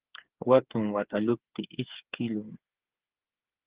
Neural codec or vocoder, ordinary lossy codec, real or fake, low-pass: codec, 16 kHz, 8 kbps, FreqCodec, smaller model; Opus, 16 kbps; fake; 3.6 kHz